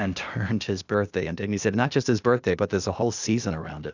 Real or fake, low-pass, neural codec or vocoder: fake; 7.2 kHz; codec, 16 kHz, 0.8 kbps, ZipCodec